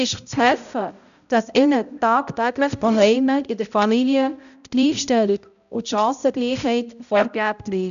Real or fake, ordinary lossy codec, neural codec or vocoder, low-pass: fake; none; codec, 16 kHz, 0.5 kbps, X-Codec, HuBERT features, trained on balanced general audio; 7.2 kHz